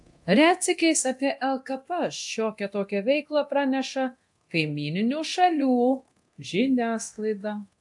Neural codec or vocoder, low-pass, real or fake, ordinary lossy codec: codec, 24 kHz, 0.9 kbps, DualCodec; 10.8 kHz; fake; AAC, 64 kbps